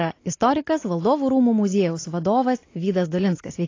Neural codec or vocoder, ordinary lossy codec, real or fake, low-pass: none; AAC, 32 kbps; real; 7.2 kHz